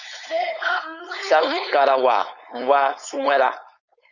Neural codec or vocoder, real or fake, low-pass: codec, 16 kHz, 4.8 kbps, FACodec; fake; 7.2 kHz